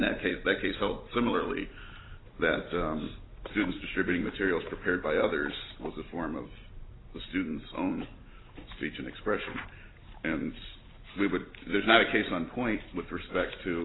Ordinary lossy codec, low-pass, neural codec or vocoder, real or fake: AAC, 16 kbps; 7.2 kHz; none; real